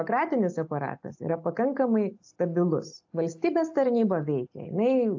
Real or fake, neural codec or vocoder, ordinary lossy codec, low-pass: real; none; AAC, 48 kbps; 7.2 kHz